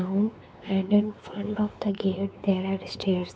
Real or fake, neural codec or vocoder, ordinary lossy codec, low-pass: fake; codec, 16 kHz, 4 kbps, X-Codec, WavLM features, trained on Multilingual LibriSpeech; none; none